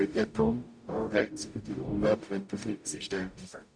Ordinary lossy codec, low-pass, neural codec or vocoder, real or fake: none; 9.9 kHz; codec, 44.1 kHz, 0.9 kbps, DAC; fake